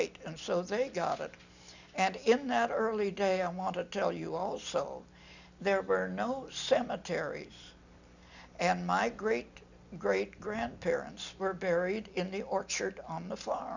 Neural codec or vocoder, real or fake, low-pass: none; real; 7.2 kHz